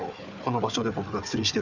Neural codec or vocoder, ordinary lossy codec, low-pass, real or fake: codec, 16 kHz, 4 kbps, FunCodec, trained on Chinese and English, 50 frames a second; none; 7.2 kHz; fake